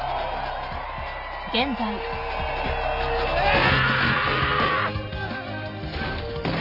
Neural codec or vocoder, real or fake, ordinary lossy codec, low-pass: none; real; none; 5.4 kHz